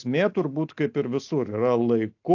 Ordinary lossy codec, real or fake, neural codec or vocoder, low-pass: AAC, 48 kbps; real; none; 7.2 kHz